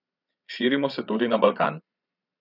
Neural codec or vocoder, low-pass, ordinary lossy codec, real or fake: vocoder, 22.05 kHz, 80 mel bands, Vocos; 5.4 kHz; none; fake